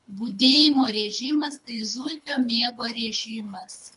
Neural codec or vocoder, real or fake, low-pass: codec, 24 kHz, 3 kbps, HILCodec; fake; 10.8 kHz